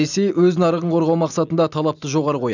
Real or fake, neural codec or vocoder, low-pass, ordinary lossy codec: real; none; 7.2 kHz; none